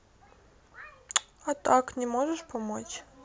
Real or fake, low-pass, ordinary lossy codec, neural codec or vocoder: real; none; none; none